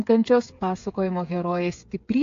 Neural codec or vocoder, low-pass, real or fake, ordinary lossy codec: codec, 16 kHz, 8 kbps, FreqCodec, smaller model; 7.2 kHz; fake; AAC, 48 kbps